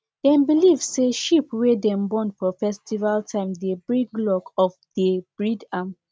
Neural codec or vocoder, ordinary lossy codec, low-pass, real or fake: none; none; none; real